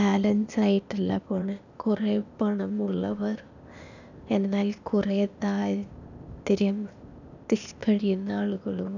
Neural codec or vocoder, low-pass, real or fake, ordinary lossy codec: codec, 16 kHz, 0.8 kbps, ZipCodec; 7.2 kHz; fake; none